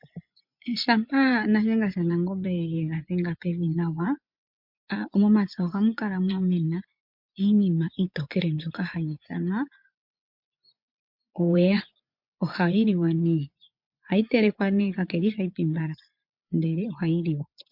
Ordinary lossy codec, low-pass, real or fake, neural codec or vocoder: MP3, 48 kbps; 5.4 kHz; fake; vocoder, 24 kHz, 100 mel bands, Vocos